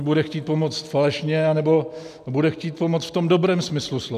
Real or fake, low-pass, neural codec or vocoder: real; 14.4 kHz; none